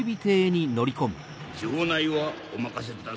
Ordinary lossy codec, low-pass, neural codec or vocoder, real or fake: none; none; none; real